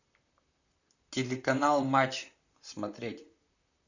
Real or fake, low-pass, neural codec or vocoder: fake; 7.2 kHz; vocoder, 44.1 kHz, 128 mel bands, Pupu-Vocoder